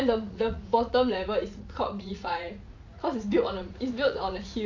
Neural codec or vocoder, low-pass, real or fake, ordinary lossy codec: vocoder, 44.1 kHz, 80 mel bands, Vocos; 7.2 kHz; fake; AAC, 48 kbps